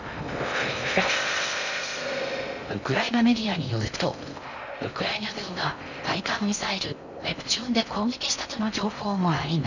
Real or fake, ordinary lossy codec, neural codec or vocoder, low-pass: fake; none; codec, 16 kHz in and 24 kHz out, 0.6 kbps, FocalCodec, streaming, 2048 codes; 7.2 kHz